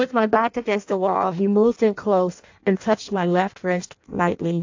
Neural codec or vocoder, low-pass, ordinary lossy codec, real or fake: codec, 16 kHz in and 24 kHz out, 0.6 kbps, FireRedTTS-2 codec; 7.2 kHz; AAC, 48 kbps; fake